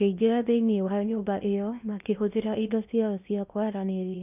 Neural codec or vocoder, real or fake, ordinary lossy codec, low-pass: codec, 16 kHz in and 24 kHz out, 0.8 kbps, FocalCodec, streaming, 65536 codes; fake; none; 3.6 kHz